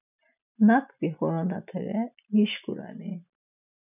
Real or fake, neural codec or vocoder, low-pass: real; none; 3.6 kHz